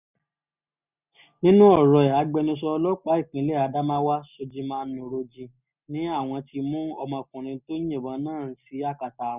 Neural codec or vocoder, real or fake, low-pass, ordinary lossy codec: none; real; 3.6 kHz; none